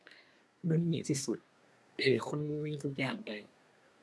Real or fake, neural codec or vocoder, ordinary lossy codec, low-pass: fake; codec, 24 kHz, 1 kbps, SNAC; none; none